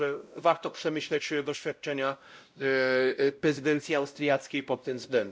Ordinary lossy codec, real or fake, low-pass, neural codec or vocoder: none; fake; none; codec, 16 kHz, 0.5 kbps, X-Codec, WavLM features, trained on Multilingual LibriSpeech